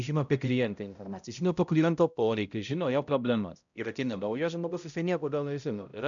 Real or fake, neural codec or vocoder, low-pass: fake; codec, 16 kHz, 0.5 kbps, X-Codec, HuBERT features, trained on balanced general audio; 7.2 kHz